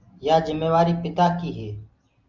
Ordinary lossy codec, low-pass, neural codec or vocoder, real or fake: Opus, 32 kbps; 7.2 kHz; none; real